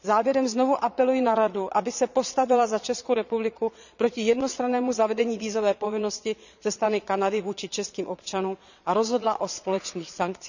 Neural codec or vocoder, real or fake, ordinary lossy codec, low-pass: vocoder, 22.05 kHz, 80 mel bands, Vocos; fake; none; 7.2 kHz